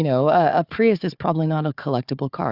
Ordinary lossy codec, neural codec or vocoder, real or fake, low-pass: Opus, 64 kbps; codec, 16 kHz, 4 kbps, FunCodec, trained on Chinese and English, 50 frames a second; fake; 5.4 kHz